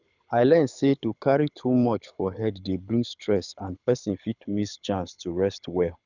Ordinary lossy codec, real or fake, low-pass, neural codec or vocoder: none; fake; 7.2 kHz; codec, 24 kHz, 6 kbps, HILCodec